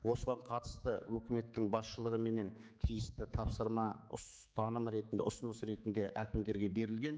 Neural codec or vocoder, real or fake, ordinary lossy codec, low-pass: codec, 16 kHz, 4 kbps, X-Codec, HuBERT features, trained on general audio; fake; none; none